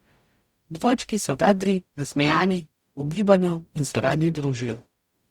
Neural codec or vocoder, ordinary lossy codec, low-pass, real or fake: codec, 44.1 kHz, 0.9 kbps, DAC; Opus, 64 kbps; 19.8 kHz; fake